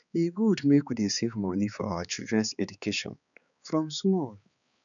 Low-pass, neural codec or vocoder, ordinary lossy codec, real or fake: 7.2 kHz; codec, 16 kHz, 4 kbps, X-Codec, HuBERT features, trained on balanced general audio; none; fake